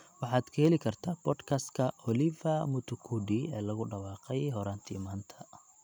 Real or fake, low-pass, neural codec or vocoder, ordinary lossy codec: real; 19.8 kHz; none; none